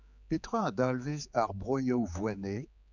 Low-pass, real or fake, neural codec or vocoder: 7.2 kHz; fake; codec, 16 kHz, 4 kbps, X-Codec, HuBERT features, trained on general audio